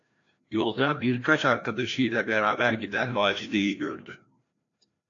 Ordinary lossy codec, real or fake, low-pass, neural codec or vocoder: AAC, 48 kbps; fake; 7.2 kHz; codec, 16 kHz, 1 kbps, FreqCodec, larger model